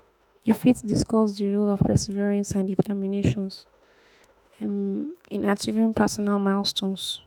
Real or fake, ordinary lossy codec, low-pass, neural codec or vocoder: fake; none; 19.8 kHz; autoencoder, 48 kHz, 32 numbers a frame, DAC-VAE, trained on Japanese speech